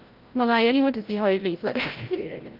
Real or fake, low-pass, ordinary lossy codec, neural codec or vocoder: fake; 5.4 kHz; Opus, 24 kbps; codec, 16 kHz, 0.5 kbps, FreqCodec, larger model